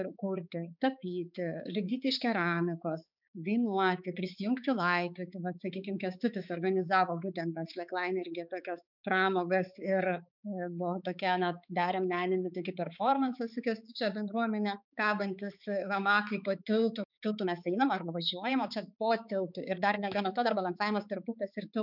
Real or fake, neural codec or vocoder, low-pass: fake; codec, 16 kHz, 4 kbps, X-Codec, HuBERT features, trained on balanced general audio; 5.4 kHz